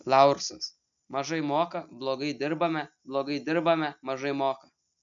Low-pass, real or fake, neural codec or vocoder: 7.2 kHz; real; none